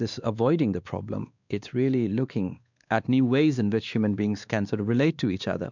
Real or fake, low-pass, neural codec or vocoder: fake; 7.2 kHz; codec, 16 kHz, 2 kbps, X-Codec, WavLM features, trained on Multilingual LibriSpeech